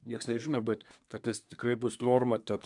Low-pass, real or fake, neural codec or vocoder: 10.8 kHz; fake; codec, 24 kHz, 1 kbps, SNAC